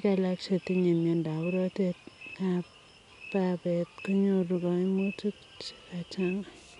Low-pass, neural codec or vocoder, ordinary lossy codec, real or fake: 10.8 kHz; none; none; real